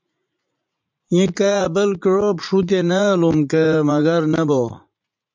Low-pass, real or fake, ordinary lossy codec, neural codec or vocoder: 7.2 kHz; fake; MP3, 64 kbps; vocoder, 44.1 kHz, 80 mel bands, Vocos